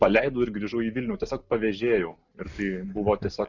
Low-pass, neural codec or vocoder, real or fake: 7.2 kHz; none; real